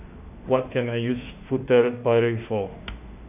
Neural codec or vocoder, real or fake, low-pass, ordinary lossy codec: autoencoder, 48 kHz, 32 numbers a frame, DAC-VAE, trained on Japanese speech; fake; 3.6 kHz; none